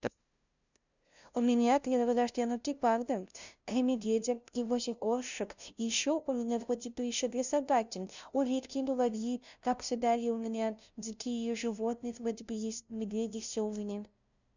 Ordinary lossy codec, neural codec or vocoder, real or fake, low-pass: none; codec, 16 kHz, 0.5 kbps, FunCodec, trained on LibriTTS, 25 frames a second; fake; 7.2 kHz